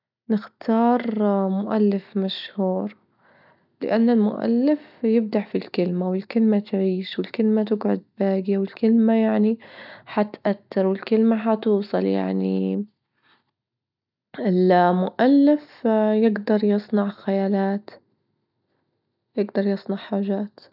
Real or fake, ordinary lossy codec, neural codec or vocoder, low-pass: real; none; none; 5.4 kHz